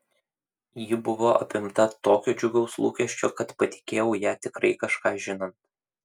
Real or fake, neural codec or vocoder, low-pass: real; none; 19.8 kHz